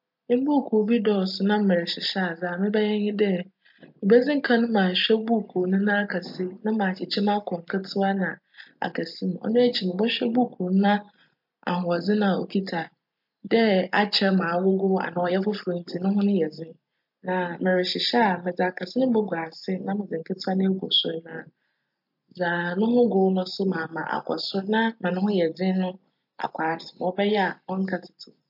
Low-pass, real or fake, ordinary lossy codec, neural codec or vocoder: 5.4 kHz; real; MP3, 48 kbps; none